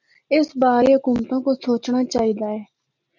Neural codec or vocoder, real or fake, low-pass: none; real; 7.2 kHz